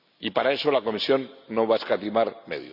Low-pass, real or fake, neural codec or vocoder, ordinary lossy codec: 5.4 kHz; real; none; none